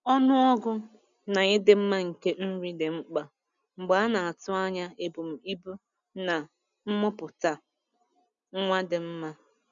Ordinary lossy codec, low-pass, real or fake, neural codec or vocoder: none; 7.2 kHz; real; none